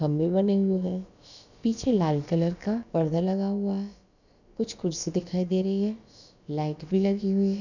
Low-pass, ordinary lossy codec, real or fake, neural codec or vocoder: 7.2 kHz; none; fake; codec, 16 kHz, about 1 kbps, DyCAST, with the encoder's durations